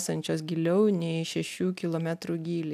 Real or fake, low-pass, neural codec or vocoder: real; 14.4 kHz; none